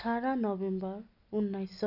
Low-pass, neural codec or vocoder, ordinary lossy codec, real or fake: 5.4 kHz; none; none; real